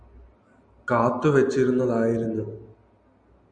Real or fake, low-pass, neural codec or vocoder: real; 9.9 kHz; none